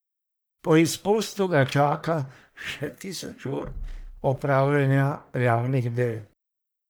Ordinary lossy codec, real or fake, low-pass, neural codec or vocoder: none; fake; none; codec, 44.1 kHz, 1.7 kbps, Pupu-Codec